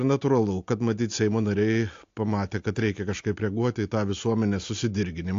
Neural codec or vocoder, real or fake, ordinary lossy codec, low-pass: none; real; AAC, 48 kbps; 7.2 kHz